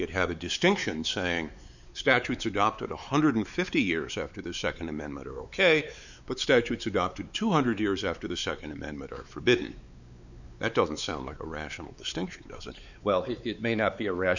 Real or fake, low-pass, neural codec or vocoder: fake; 7.2 kHz; codec, 16 kHz, 4 kbps, X-Codec, WavLM features, trained on Multilingual LibriSpeech